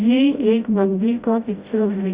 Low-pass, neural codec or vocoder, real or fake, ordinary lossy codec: 3.6 kHz; codec, 16 kHz, 0.5 kbps, FreqCodec, smaller model; fake; Opus, 64 kbps